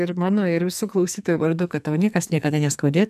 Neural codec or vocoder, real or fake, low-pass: codec, 44.1 kHz, 2.6 kbps, SNAC; fake; 14.4 kHz